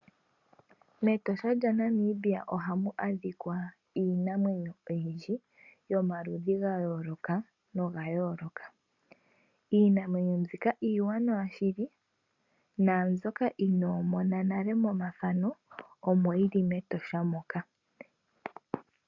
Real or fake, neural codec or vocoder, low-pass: real; none; 7.2 kHz